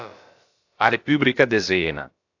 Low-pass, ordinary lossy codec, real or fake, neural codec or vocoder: 7.2 kHz; AAC, 48 kbps; fake; codec, 16 kHz, about 1 kbps, DyCAST, with the encoder's durations